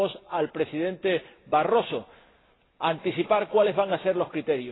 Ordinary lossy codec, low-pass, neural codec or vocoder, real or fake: AAC, 16 kbps; 7.2 kHz; none; real